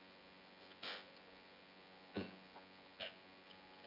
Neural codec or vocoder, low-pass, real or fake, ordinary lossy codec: vocoder, 24 kHz, 100 mel bands, Vocos; 5.4 kHz; fake; none